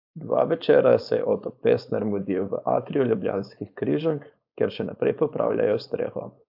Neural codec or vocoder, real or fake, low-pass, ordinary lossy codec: codec, 16 kHz, 4.8 kbps, FACodec; fake; 5.4 kHz; AAC, 48 kbps